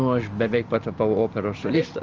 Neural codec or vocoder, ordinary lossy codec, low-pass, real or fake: none; Opus, 24 kbps; 7.2 kHz; real